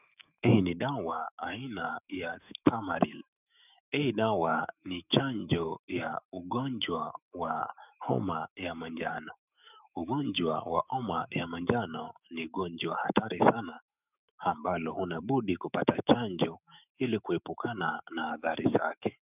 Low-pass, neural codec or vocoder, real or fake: 3.6 kHz; autoencoder, 48 kHz, 128 numbers a frame, DAC-VAE, trained on Japanese speech; fake